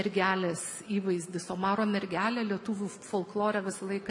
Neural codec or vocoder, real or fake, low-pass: none; real; 10.8 kHz